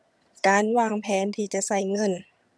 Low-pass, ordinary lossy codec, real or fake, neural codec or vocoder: none; none; fake; vocoder, 22.05 kHz, 80 mel bands, HiFi-GAN